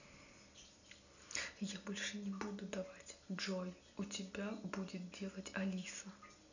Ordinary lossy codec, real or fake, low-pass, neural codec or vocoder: none; real; 7.2 kHz; none